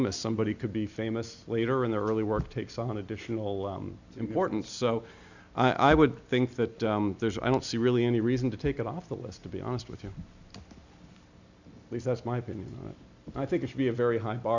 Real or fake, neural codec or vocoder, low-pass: real; none; 7.2 kHz